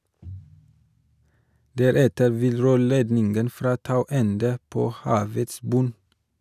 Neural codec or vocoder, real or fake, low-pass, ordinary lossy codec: none; real; 14.4 kHz; none